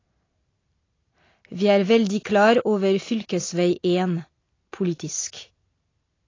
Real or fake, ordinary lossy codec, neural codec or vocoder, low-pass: real; AAC, 32 kbps; none; 7.2 kHz